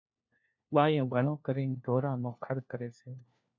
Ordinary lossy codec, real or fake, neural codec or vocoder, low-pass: MP3, 64 kbps; fake; codec, 16 kHz, 1 kbps, FunCodec, trained on LibriTTS, 50 frames a second; 7.2 kHz